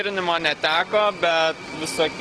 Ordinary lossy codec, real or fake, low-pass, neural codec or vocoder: Opus, 16 kbps; real; 10.8 kHz; none